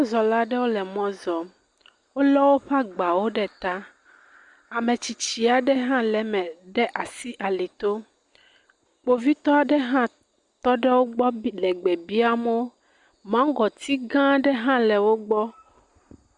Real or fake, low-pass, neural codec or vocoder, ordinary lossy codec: real; 10.8 kHz; none; Opus, 64 kbps